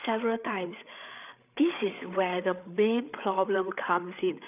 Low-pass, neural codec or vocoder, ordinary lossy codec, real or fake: 3.6 kHz; codec, 16 kHz, 8 kbps, FreqCodec, larger model; none; fake